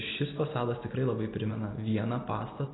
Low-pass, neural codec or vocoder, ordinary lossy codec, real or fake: 7.2 kHz; none; AAC, 16 kbps; real